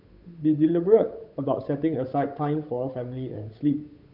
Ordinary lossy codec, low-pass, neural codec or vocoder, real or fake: none; 5.4 kHz; codec, 16 kHz, 8 kbps, FunCodec, trained on Chinese and English, 25 frames a second; fake